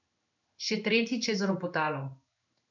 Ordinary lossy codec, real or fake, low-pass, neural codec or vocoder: none; fake; 7.2 kHz; codec, 16 kHz in and 24 kHz out, 1 kbps, XY-Tokenizer